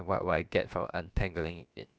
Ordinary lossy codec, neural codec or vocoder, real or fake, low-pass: none; codec, 16 kHz, about 1 kbps, DyCAST, with the encoder's durations; fake; none